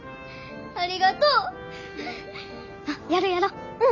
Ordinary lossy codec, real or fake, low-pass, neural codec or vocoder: none; real; 7.2 kHz; none